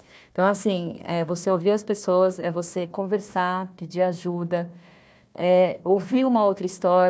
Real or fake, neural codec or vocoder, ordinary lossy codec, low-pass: fake; codec, 16 kHz, 1 kbps, FunCodec, trained on Chinese and English, 50 frames a second; none; none